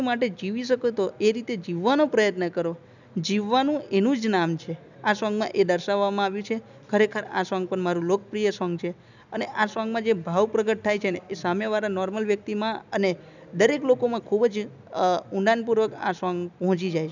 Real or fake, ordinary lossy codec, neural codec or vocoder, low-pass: real; none; none; 7.2 kHz